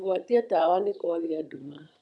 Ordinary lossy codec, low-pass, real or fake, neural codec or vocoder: none; none; fake; vocoder, 22.05 kHz, 80 mel bands, HiFi-GAN